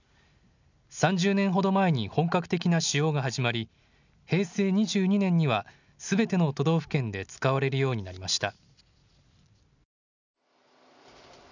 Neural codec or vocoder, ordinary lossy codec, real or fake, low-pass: none; none; real; 7.2 kHz